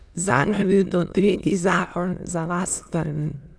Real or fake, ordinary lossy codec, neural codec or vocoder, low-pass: fake; none; autoencoder, 22.05 kHz, a latent of 192 numbers a frame, VITS, trained on many speakers; none